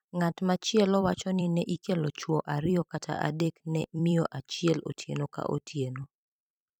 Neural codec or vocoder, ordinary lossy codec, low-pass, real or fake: vocoder, 44.1 kHz, 128 mel bands every 256 samples, BigVGAN v2; none; 19.8 kHz; fake